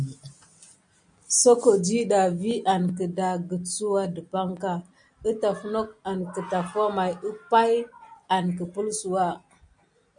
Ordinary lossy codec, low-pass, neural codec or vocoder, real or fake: MP3, 64 kbps; 9.9 kHz; none; real